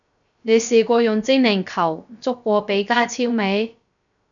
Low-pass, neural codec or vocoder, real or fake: 7.2 kHz; codec, 16 kHz, 0.3 kbps, FocalCodec; fake